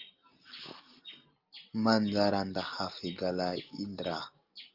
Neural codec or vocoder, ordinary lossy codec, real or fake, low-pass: none; Opus, 32 kbps; real; 5.4 kHz